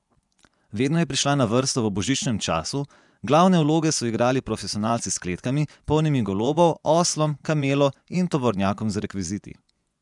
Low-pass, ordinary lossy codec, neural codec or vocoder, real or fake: 10.8 kHz; none; vocoder, 24 kHz, 100 mel bands, Vocos; fake